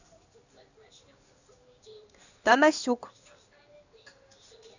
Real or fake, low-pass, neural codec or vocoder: fake; 7.2 kHz; codec, 16 kHz in and 24 kHz out, 1 kbps, XY-Tokenizer